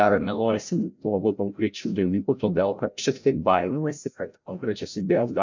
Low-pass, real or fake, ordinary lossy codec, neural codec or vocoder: 7.2 kHz; fake; MP3, 64 kbps; codec, 16 kHz, 0.5 kbps, FreqCodec, larger model